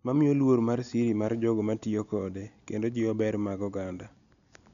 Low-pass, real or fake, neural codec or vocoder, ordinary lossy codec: 7.2 kHz; real; none; none